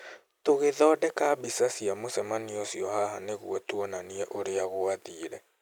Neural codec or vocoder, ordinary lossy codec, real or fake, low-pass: none; none; real; 19.8 kHz